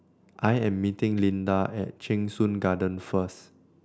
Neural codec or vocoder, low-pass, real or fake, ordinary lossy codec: none; none; real; none